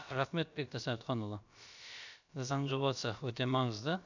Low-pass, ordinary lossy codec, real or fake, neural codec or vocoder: 7.2 kHz; none; fake; codec, 16 kHz, about 1 kbps, DyCAST, with the encoder's durations